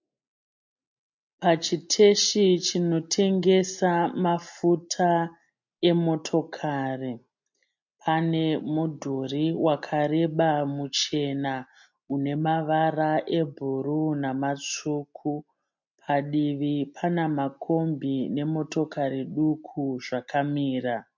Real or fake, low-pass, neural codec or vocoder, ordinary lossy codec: real; 7.2 kHz; none; MP3, 48 kbps